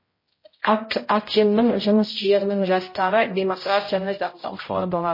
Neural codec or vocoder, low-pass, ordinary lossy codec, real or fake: codec, 16 kHz, 0.5 kbps, X-Codec, HuBERT features, trained on general audio; 5.4 kHz; MP3, 24 kbps; fake